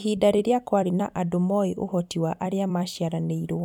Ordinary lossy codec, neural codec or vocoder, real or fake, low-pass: none; vocoder, 44.1 kHz, 128 mel bands every 256 samples, BigVGAN v2; fake; 19.8 kHz